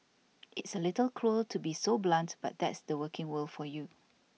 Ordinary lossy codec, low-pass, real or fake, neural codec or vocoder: none; none; real; none